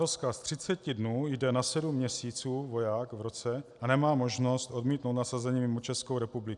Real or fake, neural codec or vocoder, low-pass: real; none; 10.8 kHz